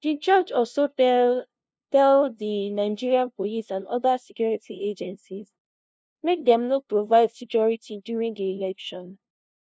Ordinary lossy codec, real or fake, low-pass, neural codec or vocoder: none; fake; none; codec, 16 kHz, 0.5 kbps, FunCodec, trained on LibriTTS, 25 frames a second